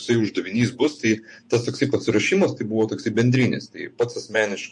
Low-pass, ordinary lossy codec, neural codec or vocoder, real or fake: 10.8 kHz; MP3, 48 kbps; vocoder, 48 kHz, 128 mel bands, Vocos; fake